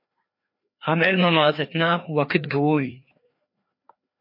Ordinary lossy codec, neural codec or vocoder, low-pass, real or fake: MP3, 32 kbps; codec, 16 kHz, 2 kbps, FreqCodec, larger model; 5.4 kHz; fake